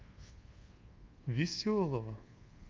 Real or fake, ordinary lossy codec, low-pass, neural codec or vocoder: fake; Opus, 24 kbps; 7.2 kHz; codec, 24 kHz, 1.2 kbps, DualCodec